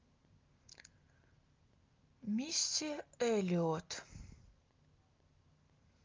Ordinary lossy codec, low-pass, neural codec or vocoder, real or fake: Opus, 32 kbps; 7.2 kHz; none; real